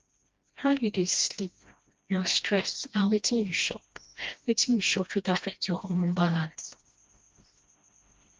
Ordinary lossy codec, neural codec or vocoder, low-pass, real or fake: Opus, 32 kbps; codec, 16 kHz, 1 kbps, FreqCodec, smaller model; 7.2 kHz; fake